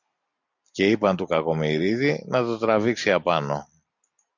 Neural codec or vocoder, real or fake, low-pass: none; real; 7.2 kHz